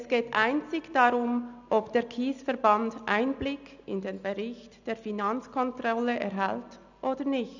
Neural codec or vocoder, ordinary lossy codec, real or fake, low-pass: none; none; real; 7.2 kHz